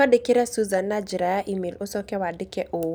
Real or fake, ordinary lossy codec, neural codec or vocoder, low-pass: real; none; none; none